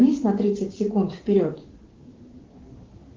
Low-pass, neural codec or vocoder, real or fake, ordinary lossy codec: 7.2 kHz; codec, 16 kHz, 6 kbps, DAC; fake; Opus, 16 kbps